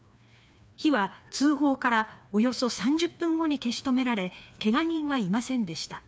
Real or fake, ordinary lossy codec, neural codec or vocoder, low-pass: fake; none; codec, 16 kHz, 2 kbps, FreqCodec, larger model; none